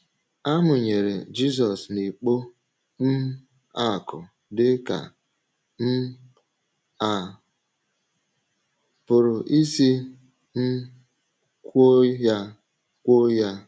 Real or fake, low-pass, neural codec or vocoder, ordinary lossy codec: real; none; none; none